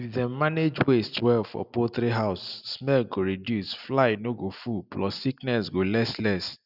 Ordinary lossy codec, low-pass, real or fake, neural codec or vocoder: none; 5.4 kHz; real; none